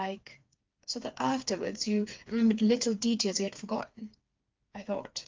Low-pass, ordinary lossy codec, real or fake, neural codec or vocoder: 7.2 kHz; Opus, 24 kbps; fake; codec, 16 kHz, 4 kbps, FreqCodec, smaller model